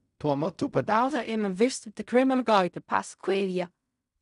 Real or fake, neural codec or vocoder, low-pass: fake; codec, 16 kHz in and 24 kHz out, 0.4 kbps, LongCat-Audio-Codec, fine tuned four codebook decoder; 10.8 kHz